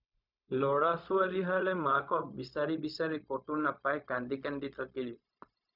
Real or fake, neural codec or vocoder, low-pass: fake; codec, 16 kHz, 0.4 kbps, LongCat-Audio-Codec; 5.4 kHz